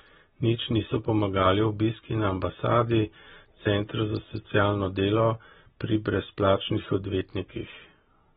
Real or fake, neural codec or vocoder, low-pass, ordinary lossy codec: real; none; 19.8 kHz; AAC, 16 kbps